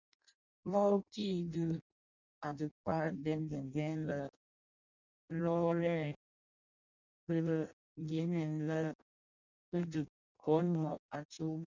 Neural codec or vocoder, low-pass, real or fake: codec, 16 kHz in and 24 kHz out, 0.6 kbps, FireRedTTS-2 codec; 7.2 kHz; fake